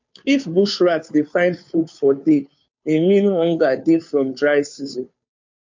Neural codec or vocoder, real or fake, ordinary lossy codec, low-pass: codec, 16 kHz, 2 kbps, FunCodec, trained on Chinese and English, 25 frames a second; fake; MP3, 48 kbps; 7.2 kHz